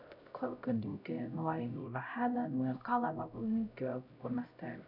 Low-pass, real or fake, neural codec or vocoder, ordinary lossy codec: 5.4 kHz; fake; codec, 16 kHz, 0.5 kbps, X-Codec, HuBERT features, trained on LibriSpeech; none